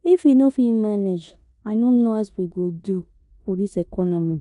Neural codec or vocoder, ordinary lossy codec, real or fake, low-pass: codec, 16 kHz in and 24 kHz out, 0.9 kbps, LongCat-Audio-Codec, four codebook decoder; none; fake; 10.8 kHz